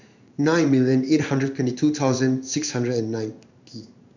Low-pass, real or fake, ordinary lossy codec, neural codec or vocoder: 7.2 kHz; fake; none; codec, 16 kHz in and 24 kHz out, 1 kbps, XY-Tokenizer